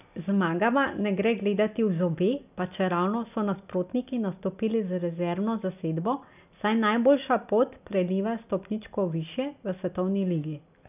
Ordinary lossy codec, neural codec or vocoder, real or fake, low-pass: none; none; real; 3.6 kHz